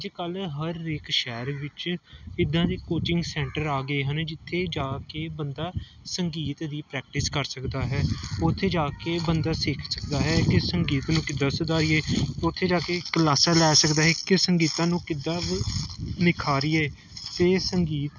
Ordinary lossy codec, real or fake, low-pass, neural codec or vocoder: none; real; 7.2 kHz; none